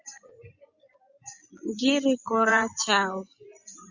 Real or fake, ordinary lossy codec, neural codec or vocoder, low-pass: fake; Opus, 64 kbps; vocoder, 24 kHz, 100 mel bands, Vocos; 7.2 kHz